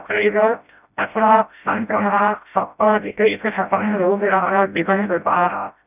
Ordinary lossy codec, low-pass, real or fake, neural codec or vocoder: none; 3.6 kHz; fake; codec, 16 kHz, 0.5 kbps, FreqCodec, smaller model